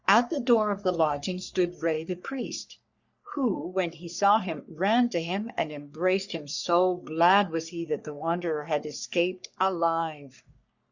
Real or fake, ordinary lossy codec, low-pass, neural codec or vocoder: fake; Opus, 64 kbps; 7.2 kHz; codec, 44.1 kHz, 3.4 kbps, Pupu-Codec